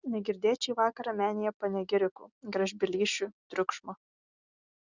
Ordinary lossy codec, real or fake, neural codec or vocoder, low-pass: Opus, 64 kbps; real; none; 7.2 kHz